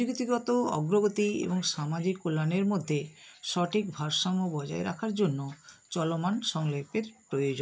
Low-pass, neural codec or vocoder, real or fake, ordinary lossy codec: none; none; real; none